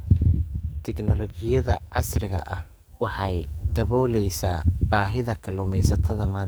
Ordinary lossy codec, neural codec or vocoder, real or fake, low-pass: none; codec, 44.1 kHz, 2.6 kbps, SNAC; fake; none